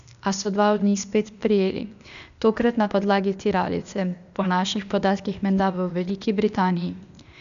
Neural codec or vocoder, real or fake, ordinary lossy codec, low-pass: codec, 16 kHz, 0.8 kbps, ZipCodec; fake; none; 7.2 kHz